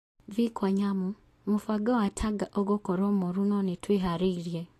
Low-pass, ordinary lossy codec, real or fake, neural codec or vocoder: 14.4 kHz; AAC, 48 kbps; real; none